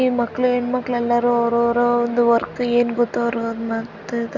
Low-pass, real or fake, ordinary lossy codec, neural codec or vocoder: 7.2 kHz; real; none; none